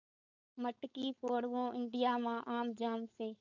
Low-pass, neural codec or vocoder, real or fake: 7.2 kHz; codec, 16 kHz, 4.8 kbps, FACodec; fake